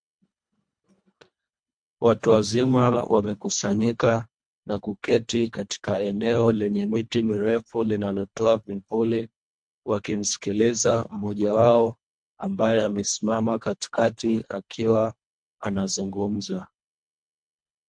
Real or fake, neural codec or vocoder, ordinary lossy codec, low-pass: fake; codec, 24 kHz, 1.5 kbps, HILCodec; MP3, 64 kbps; 9.9 kHz